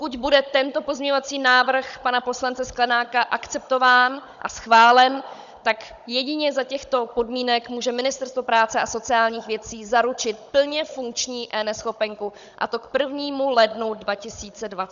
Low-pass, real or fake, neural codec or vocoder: 7.2 kHz; fake; codec, 16 kHz, 16 kbps, FunCodec, trained on Chinese and English, 50 frames a second